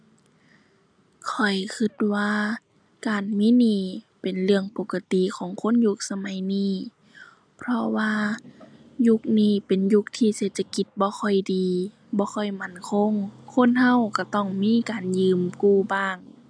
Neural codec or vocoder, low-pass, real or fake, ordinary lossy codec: none; 9.9 kHz; real; none